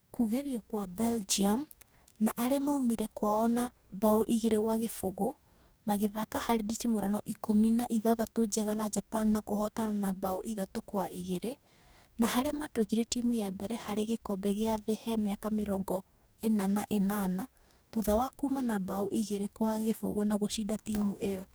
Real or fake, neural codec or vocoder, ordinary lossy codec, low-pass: fake; codec, 44.1 kHz, 2.6 kbps, DAC; none; none